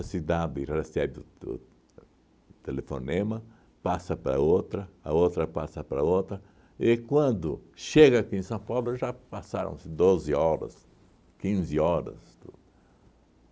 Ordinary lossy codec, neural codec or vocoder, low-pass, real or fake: none; none; none; real